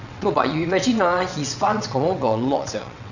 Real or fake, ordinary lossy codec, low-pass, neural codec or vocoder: fake; none; 7.2 kHz; vocoder, 22.05 kHz, 80 mel bands, WaveNeXt